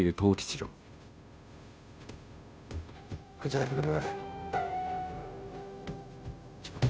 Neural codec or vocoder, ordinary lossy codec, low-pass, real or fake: codec, 16 kHz, 0.5 kbps, FunCodec, trained on Chinese and English, 25 frames a second; none; none; fake